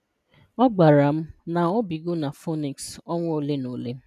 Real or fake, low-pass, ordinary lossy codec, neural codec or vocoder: real; 14.4 kHz; none; none